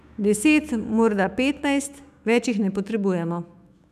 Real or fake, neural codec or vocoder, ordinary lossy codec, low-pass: fake; autoencoder, 48 kHz, 128 numbers a frame, DAC-VAE, trained on Japanese speech; none; 14.4 kHz